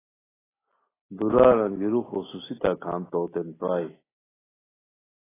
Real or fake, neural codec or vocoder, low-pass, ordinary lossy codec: real; none; 3.6 kHz; AAC, 16 kbps